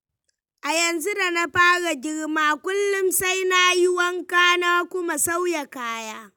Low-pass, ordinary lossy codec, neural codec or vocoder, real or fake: 19.8 kHz; none; none; real